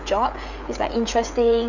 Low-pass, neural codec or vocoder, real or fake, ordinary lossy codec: 7.2 kHz; codec, 16 kHz, 16 kbps, FreqCodec, smaller model; fake; none